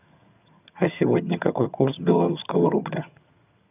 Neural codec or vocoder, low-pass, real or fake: vocoder, 22.05 kHz, 80 mel bands, HiFi-GAN; 3.6 kHz; fake